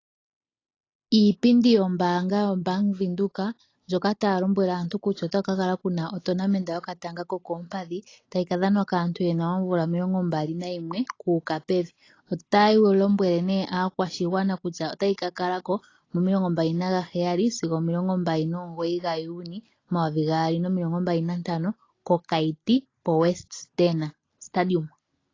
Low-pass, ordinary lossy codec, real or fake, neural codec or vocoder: 7.2 kHz; AAC, 32 kbps; real; none